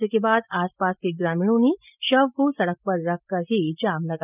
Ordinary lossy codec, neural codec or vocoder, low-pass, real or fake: none; none; 3.6 kHz; real